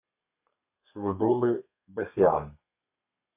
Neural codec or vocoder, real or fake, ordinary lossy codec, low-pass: codec, 32 kHz, 1.9 kbps, SNAC; fake; MP3, 32 kbps; 3.6 kHz